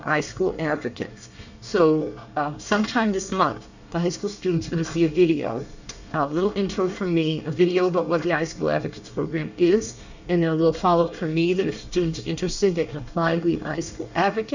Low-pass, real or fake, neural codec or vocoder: 7.2 kHz; fake; codec, 24 kHz, 1 kbps, SNAC